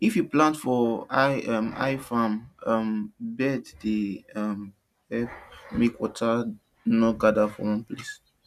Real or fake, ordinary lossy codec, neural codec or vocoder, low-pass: real; none; none; 14.4 kHz